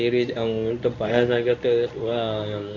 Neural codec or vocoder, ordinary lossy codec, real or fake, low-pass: codec, 24 kHz, 0.9 kbps, WavTokenizer, medium speech release version 1; MP3, 48 kbps; fake; 7.2 kHz